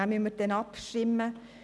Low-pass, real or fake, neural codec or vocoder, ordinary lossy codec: none; real; none; none